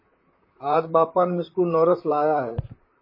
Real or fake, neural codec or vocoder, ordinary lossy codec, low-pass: fake; vocoder, 44.1 kHz, 128 mel bands, Pupu-Vocoder; MP3, 24 kbps; 5.4 kHz